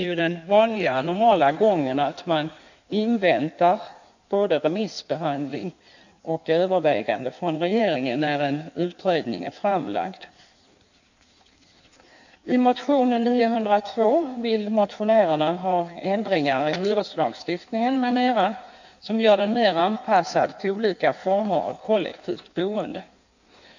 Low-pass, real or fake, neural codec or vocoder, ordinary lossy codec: 7.2 kHz; fake; codec, 16 kHz in and 24 kHz out, 1.1 kbps, FireRedTTS-2 codec; none